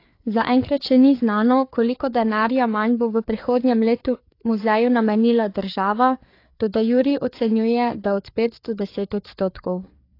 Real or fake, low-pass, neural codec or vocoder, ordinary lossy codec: fake; 5.4 kHz; codec, 16 kHz, 4 kbps, FreqCodec, larger model; AAC, 32 kbps